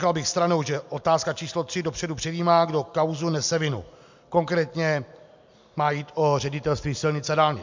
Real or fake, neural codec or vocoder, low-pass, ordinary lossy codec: real; none; 7.2 kHz; MP3, 64 kbps